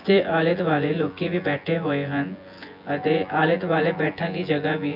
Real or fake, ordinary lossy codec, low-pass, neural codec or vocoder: fake; none; 5.4 kHz; vocoder, 24 kHz, 100 mel bands, Vocos